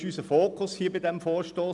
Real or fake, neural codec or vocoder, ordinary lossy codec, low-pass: real; none; none; 10.8 kHz